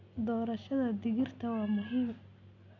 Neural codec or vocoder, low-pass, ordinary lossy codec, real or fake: none; 7.2 kHz; none; real